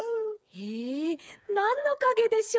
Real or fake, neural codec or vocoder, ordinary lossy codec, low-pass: fake; codec, 16 kHz, 4 kbps, FreqCodec, smaller model; none; none